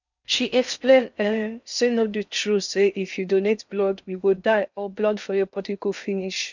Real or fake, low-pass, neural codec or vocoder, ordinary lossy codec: fake; 7.2 kHz; codec, 16 kHz in and 24 kHz out, 0.6 kbps, FocalCodec, streaming, 4096 codes; none